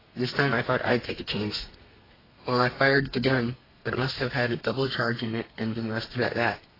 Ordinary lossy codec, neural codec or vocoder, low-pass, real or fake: AAC, 24 kbps; codec, 32 kHz, 1.9 kbps, SNAC; 5.4 kHz; fake